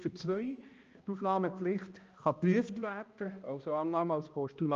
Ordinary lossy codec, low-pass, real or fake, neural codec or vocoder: Opus, 24 kbps; 7.2 kHz; fake; codec, 16 kHz, 1 kbps, X-Codec, HuBERT features, trained on balanced general audio